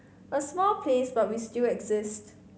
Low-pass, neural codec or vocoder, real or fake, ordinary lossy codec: none; none; real; none